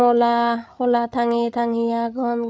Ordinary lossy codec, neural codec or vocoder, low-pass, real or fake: none; codec, 16 kHz, 8 kbps, FreqCodec, larger model; none; fake